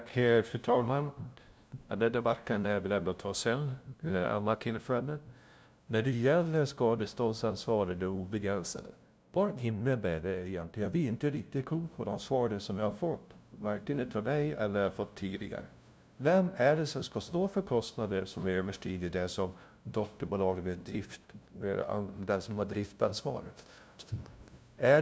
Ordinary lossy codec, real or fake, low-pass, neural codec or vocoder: none; fake; none; codec, 16 kHz, 0.5 kbps, FunCodec, trained on LibriTTS, 25 frames a second